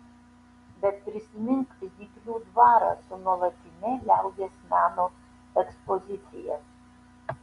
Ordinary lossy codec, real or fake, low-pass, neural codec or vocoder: Opus, 64 kbps; real; 10.8 kHz; none